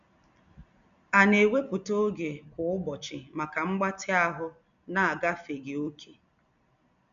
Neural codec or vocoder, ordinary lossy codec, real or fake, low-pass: none; none; real; 7.2 kHz